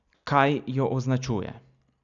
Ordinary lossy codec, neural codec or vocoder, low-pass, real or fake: none; none; 7.2 kHz; real